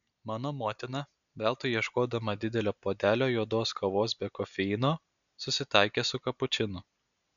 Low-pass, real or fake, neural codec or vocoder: 7.2 kHz; real; none